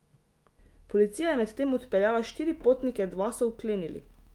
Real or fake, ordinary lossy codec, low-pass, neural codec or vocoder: fake; Opus, 32 kbps; 19.8 kHz; autoencoder, 48 kHz, 128 numbers a frame, DAC-VAE, trained on Japanese speech